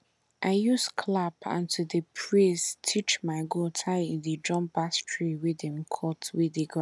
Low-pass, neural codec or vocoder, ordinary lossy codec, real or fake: none; none; none; real